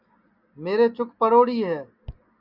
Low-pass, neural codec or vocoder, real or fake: 5.4 kHz; none; real